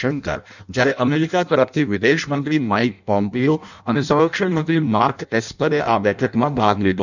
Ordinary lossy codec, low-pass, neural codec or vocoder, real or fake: none; 7.2 kHz; codec, 16 kHz in and 24 kHz out, 0.6 kbps, FireRedTTS-2 codec; fake